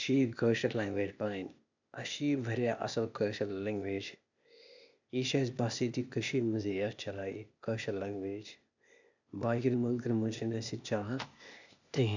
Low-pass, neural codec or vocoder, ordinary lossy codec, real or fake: 7.2 kHz; codec, 16 kHz, 0.8 kbps, ZipCodec; none; fake